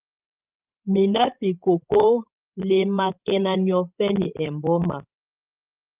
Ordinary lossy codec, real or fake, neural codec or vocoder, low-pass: Opus, 24 kbps; fake; codec, 16 kHz, 16 kbps, FreqCodec, larger model; 3.6 kHz